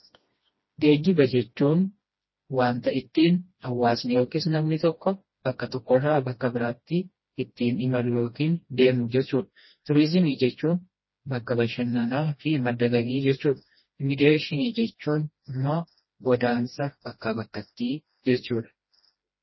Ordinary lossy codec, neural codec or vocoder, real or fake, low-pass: MP3, 24 kbps; codec, 16 kHz, 1 kbps, FreqCodec, smaller model; fake; 7.2 kHz